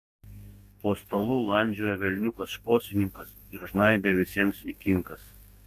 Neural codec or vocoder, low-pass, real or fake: codec, 44.1 kHz, 2.6 kbps, DAC; 14.4 kHz; fake